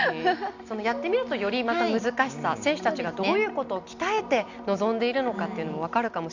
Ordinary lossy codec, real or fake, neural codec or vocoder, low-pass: none; real; none; 7.2 kHz